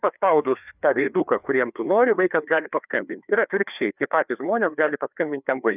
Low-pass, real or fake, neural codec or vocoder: 3.6 kHz; fake; codec, 16 kHz, 2 kbps, FreqCodec, larger model